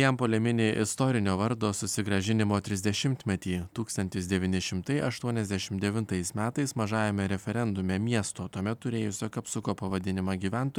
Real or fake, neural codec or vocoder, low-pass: real; none; 19.8 kHz